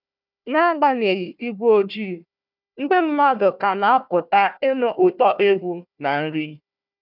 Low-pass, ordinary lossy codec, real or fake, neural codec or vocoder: 5.4 kHz; none; fake; codec, 16 kHz, 1 kbps, FunCodec, trained on Chinese and English, 50 frames a second